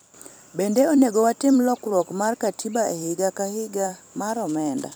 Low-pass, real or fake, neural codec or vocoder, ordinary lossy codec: none; fake; vocoder, 44.1 kHz, 128 mel bands every 256 samples, BigVGAN v2; none